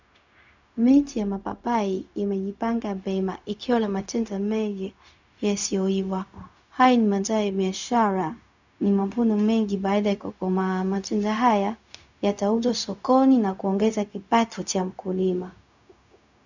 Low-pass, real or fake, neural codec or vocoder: 7.2 kHz; fake; codec, 16 kHz, 0.4 kbps, LongCat-Audio-Codec